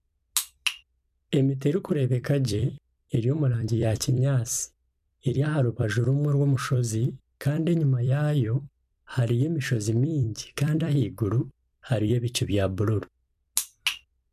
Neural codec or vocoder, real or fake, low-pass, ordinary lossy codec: vocoder, 44.1 kHz, 128 mel bands every 256 samples, BigVGAN v2; fake; 14.4 kHz; MP3, 96 kbps